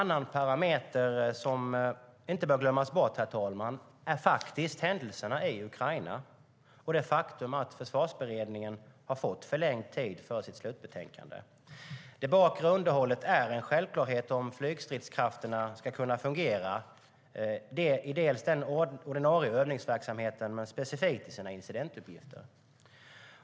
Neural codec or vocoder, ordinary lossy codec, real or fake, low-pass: none; none; real; none